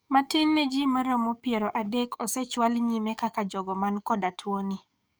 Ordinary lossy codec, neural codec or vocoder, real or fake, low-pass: none; codec, 44.1 kHz, 7.8 kbps, DAC; fake; none